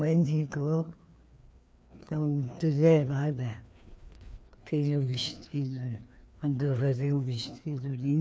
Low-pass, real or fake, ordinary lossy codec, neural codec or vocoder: none; fake; none; codec, 16 kHz, 2 kbps, FreqCodec, larger model